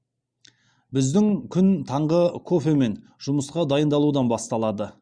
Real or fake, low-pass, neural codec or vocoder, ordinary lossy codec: real; 9.9 kHz; none; Opus, 64 kbps